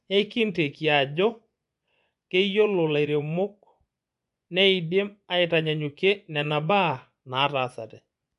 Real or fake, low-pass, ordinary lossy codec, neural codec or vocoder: fake; 10.8 kHz; none; vocoder, 24 kHz, 100 mel bands, Vocos